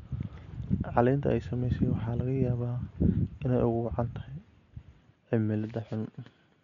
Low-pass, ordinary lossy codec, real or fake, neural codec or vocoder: 7.2 kHz; none; real; none